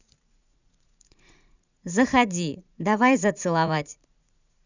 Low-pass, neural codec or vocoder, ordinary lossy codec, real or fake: 7.2 kHz; vocoder, 44.1 kHz, 80 mel bands, Vocos; none; fake